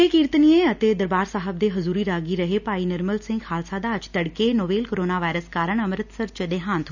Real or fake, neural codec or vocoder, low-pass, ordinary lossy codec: real; none; 7.2 kHz; none